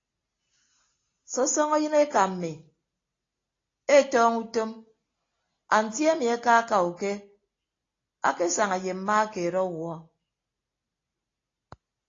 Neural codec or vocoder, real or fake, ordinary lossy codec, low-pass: none; real; AAC, 32 kbps; 7.2 kHz